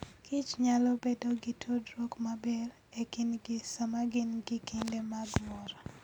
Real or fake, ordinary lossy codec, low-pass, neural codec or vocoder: real; none; 19.8 kHz; none